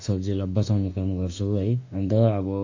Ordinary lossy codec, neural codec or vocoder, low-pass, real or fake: AAC, 48 kbps; autoencoder, 48 kHz, 32 numbers a frame, DAC-VAE, trained on Japanese speech; 7.2 kHz; fake